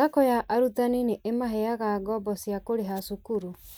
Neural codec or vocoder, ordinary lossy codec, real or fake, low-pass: none; none; real; none